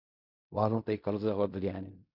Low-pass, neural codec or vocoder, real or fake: 5.4 kHz; codec, 16 kHz in and 24 kHz out, 0.4 kbps, LongCat-Audio-Codec, fine tuned four codebook decoder; fake